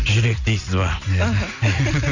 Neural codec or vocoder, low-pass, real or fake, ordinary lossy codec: none; 7.2 kHz; real; none